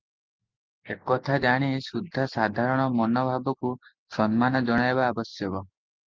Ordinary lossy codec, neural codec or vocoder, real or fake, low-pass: Opus, 24 kbps; none; real; 7.2 kHz